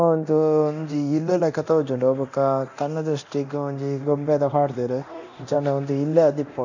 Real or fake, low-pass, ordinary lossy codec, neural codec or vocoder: fake; 7.2 kHz; none; codec, 24 kHz, 0.9 kbps, DualCodec